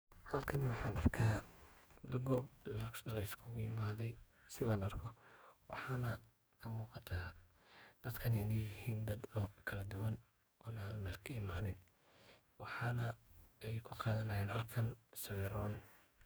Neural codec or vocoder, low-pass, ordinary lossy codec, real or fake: codec, 44.1 kHz, 2.6 kbps, DAC; none; none; fake